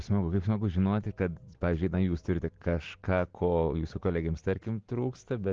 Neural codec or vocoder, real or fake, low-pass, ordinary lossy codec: none; real; 7.2 kHz; Opus, 16 kbps